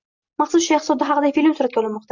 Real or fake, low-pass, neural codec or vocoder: real; 7.2 kHz; none